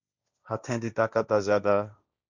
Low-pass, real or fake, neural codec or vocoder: 7.2 kHz; fake; codec, 16 kHz, 1.1 kbps, Voila-Tokenizer